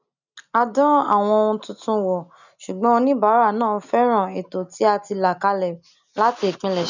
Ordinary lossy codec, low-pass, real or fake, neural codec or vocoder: none; 7.2 kHz; real; none